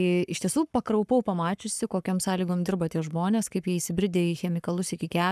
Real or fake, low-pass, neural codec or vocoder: fake; 14.4 kHz; codec, 44.1 kHz, 7.8 kbps, Pupu-Codec